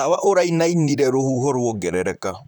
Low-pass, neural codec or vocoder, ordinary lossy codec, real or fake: 19.8 kHz; vocoder, 44.1 kHz, 128 mel bands, Pupu-Vocoder; none; fake